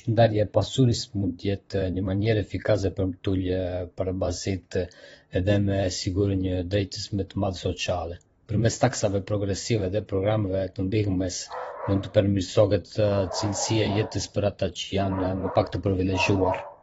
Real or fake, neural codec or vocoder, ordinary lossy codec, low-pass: fake; vocoder, 44.1 kHz, 128 mel bands, Pupu-Vocoder; AAC, 24 kbps; 19.8 kHz